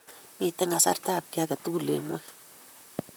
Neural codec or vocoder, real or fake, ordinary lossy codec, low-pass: vocoder, 44.1 kHz, 128 mel bands, Pupu-Vocoder; fake; none; none